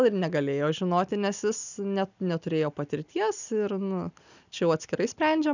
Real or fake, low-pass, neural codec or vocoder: real; 7.2 kHz; none